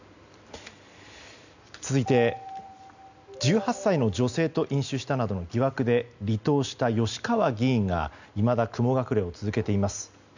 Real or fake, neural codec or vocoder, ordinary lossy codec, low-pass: real; none; none; 7.2 kHz